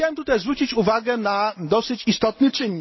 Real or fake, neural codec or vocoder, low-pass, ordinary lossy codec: real; none; 7.2 kHz; MP3, 24 kbps